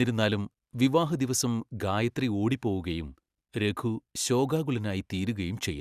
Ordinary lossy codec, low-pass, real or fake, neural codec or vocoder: Opus, 64 kbps; 14.4 kHz; real; none